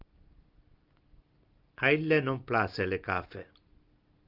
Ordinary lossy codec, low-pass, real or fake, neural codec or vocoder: Opus, 24 kbps; 5.4 kHz; real; none